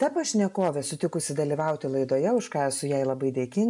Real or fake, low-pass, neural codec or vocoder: real; 10.8 kHz; none